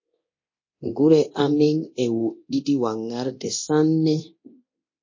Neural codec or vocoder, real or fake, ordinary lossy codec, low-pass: codec, 24 kHz, 0.9 kbps, DualCodec; fake; MP3, 32 kbps; 7.2 kHz